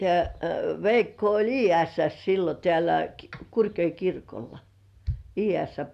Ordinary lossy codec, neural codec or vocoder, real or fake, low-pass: none; none; real; 14.4 kHz